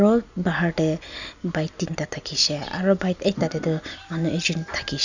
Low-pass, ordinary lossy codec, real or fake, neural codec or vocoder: 7.2 kHz; none; real; none